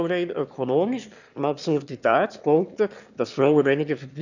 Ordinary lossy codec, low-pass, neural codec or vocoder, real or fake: none; 7.2 kHz; autoencoder, 22.05 kHz, a latent of 192 numbers a frame, VITS, trained on one speaker; fake